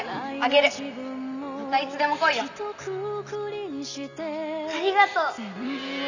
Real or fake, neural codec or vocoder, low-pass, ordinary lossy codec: real; none; 7.2 kHz; none